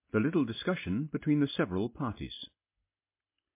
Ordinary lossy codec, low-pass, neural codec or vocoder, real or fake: MP3, 24 kbps; 3.6 kHz; none; real